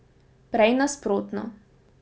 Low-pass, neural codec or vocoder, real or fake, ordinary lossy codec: none; none; real; none